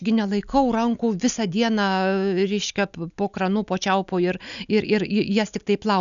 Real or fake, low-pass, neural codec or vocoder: real; 7.2 kHz; none